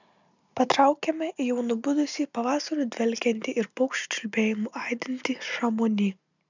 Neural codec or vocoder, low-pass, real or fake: none; 7.2 kHz; real